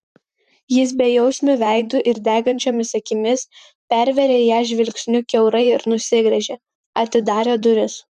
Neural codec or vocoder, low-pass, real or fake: vocoder, 44.1 kHz, 128 mel bands, Pupu-Vocoder; 14.4 kHz; fake